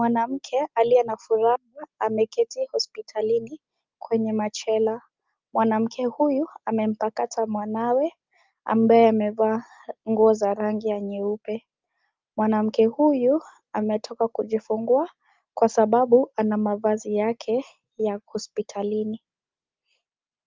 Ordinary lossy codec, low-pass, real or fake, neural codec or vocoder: Opus, 32 kbps; 7.2 kHz; real; none